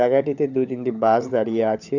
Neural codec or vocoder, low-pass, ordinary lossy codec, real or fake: codec, 16 kHz, 16 kbps, FunCodec, trained on LibriTTS, 50 frames a second; 7.2 kHz; none; fake